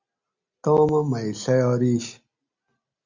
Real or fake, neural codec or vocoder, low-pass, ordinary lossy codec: real; none; 7.2 kHz; Opus, 64 kbps